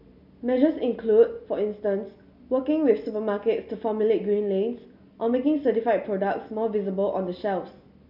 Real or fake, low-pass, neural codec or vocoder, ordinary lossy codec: real; 5.4 kHz; none; none